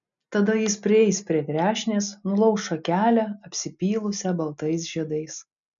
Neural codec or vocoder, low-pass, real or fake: none; 7.2 kHz; real